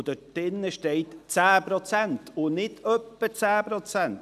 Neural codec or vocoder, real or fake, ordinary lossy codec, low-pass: none; real; none; 14.4 kHz